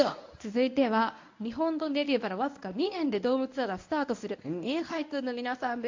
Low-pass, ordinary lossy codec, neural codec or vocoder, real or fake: 7.2 kHz; AAC, 48 kbps; codec, 24 kHz, 0.9 kbps, WavTokenizer, medium speech release version 1; fake